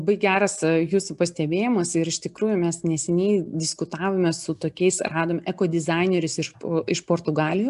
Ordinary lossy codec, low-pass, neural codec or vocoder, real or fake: MP3, 96 kbps; 10.8 kHz; none; real